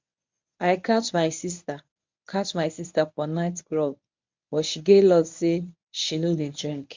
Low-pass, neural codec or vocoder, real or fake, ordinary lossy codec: 7.2 kHz; codec, 24 kHz, 0.9 kbps, WavTokenizer, medium speech release version 1; fake; MP3, 64 kbps